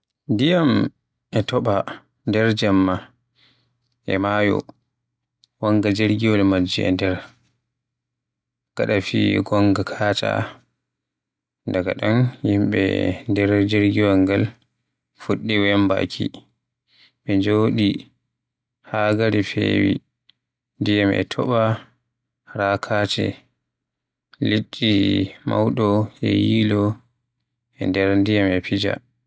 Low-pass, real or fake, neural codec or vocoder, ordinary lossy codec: none; real; none; none